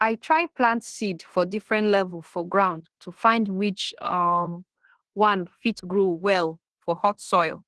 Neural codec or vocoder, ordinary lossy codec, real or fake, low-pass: codec, 16 kHz in and 24 kHz out, 0.9 kbps, LongCat-Audio-Codec, fine tuned four codebook decoder; Opus, 16 kbps; fake; 10.8 kHz